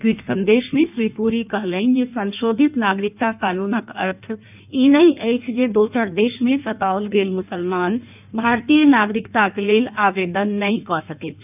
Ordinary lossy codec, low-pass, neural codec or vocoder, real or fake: none; 3.6 kHz; codec, 16 kHz in and 24 kHz out, 1.1 kbps, FireRedTTS-2 codec; fake